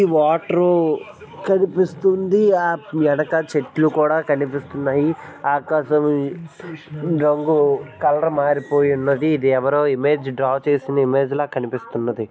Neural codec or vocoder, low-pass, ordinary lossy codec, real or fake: none; none; none; real